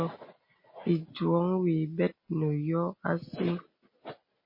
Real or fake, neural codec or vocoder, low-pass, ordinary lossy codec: real; none; 5.4 kHz; MP3, 24 kbps